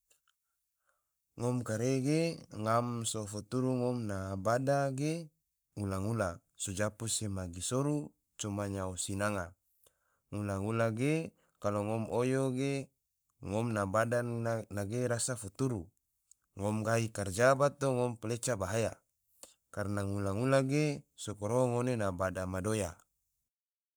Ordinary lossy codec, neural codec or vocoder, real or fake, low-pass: none; codec, 44.1 kHz, 7.8 kbps, Pupu-Codec; fake; none